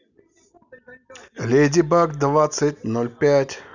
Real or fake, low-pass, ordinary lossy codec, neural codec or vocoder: real; 7.2 kHz; none; none